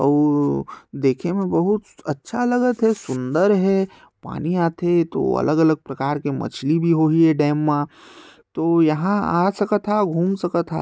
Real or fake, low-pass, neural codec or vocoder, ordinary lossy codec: real; none; none; none